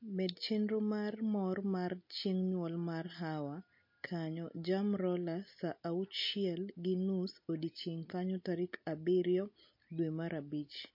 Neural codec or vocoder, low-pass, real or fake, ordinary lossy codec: none; 5.4 kHz; real; MP3, 32 kbps